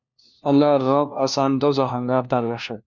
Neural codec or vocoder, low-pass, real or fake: codec, 16 kHz, 1 kbps, FunCodec, trained on LibriTTS, 50 frames a second; 7.2 kHz; fake